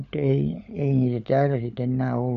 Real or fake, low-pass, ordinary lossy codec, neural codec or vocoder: fake; 7.2 kHz; none; codec, 16 kHz, 16 kbps, FunCodec, trained on LibriTTS, 50 frames a second